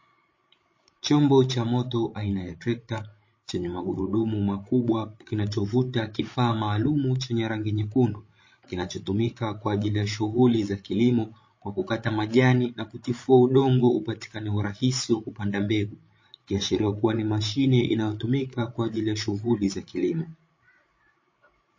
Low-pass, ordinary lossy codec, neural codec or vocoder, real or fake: 7.2 kHz; MP3, 32 kbps; codec, 16 kHz, 16 kbps, FreqCodec, larger model; fake